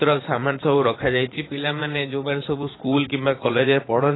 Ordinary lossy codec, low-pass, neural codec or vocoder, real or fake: AAC, 16 kbps; 7.2 kHz; vocoder, 44.1 kHz, 80 mel bands, Vocos; fake